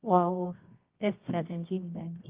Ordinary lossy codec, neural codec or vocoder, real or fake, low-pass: Opus, 32 kbps; codec, 24 kHz, 0.9 kbps, WavTokenizer, medium music audio release; fake; 3.6 kHz